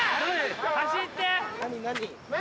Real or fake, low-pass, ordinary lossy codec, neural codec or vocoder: real; none; none; none